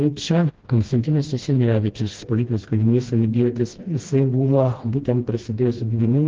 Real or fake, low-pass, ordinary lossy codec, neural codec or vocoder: fake; 7.2 kHz; Opus, 16 kbps; codec, 16 kHz, 1 kbps, FreqCodec, smaller model